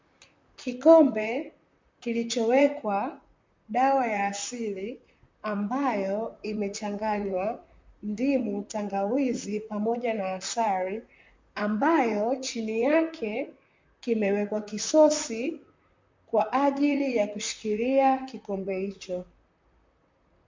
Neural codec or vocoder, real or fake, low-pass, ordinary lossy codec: vocoder, 44.1 kHz, 128 mel bands, Pupu-Vocoder; fake; 7.2 kHz; MP3, 48 kbps